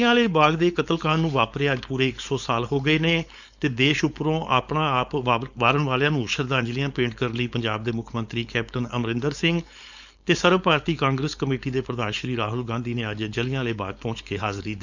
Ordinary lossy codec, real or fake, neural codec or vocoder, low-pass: none; fake; codec, 16 kHz, 4.8 kbps, FACodec; 7.2 kHz